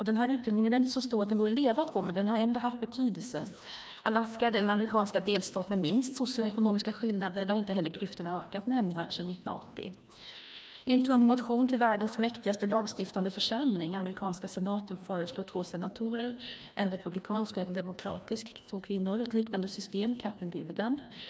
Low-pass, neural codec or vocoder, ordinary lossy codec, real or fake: none; codec, 16 kHz, 1 kbps, FreqCodec, larger model; none; fake